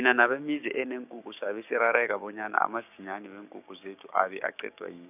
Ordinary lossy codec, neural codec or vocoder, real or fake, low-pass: none; codec, 16 kHz, 6 kbps, DAC; fake; 3.6 kHz